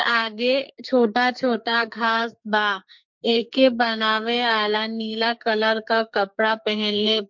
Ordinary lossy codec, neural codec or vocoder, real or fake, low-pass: MP3, 48 kbps; codec, 44.1 kHz, 2.6 kbps, SNAC; fake; 7.2 kHz